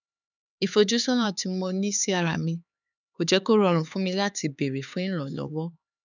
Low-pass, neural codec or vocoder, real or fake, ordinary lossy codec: 7.2 kHz; codec, 16 kHz, 4 kbps, X-Codec, HuBERT features, trained on LibriSpeech; fake; none